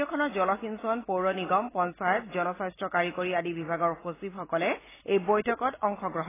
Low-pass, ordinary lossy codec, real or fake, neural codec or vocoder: 3.6 kHz; AAC, 16 kbps; real; none